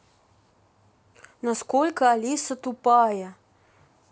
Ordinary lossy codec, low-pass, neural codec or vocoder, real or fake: none; none; none; real